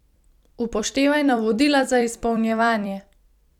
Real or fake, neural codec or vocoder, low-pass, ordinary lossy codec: fake; vocoder, 44.1 kHz, 128 mel bands every 256 samples, BigVGAN v2; 19.8 kHz; none